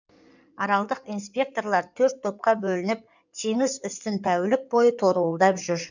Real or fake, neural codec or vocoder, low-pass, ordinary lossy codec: fake; codec, 16 kHz in and 24 kHz out, 2.2 kbps, FireRedTTS-2 codec; 7.2 kHz; none